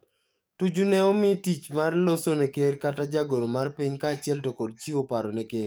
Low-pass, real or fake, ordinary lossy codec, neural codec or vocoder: none; fake; none; codec, 44.1 kHz, 7.8 kbps, DAC